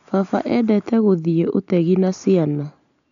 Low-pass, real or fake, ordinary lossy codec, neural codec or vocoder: 7.2 kHz; real; none; none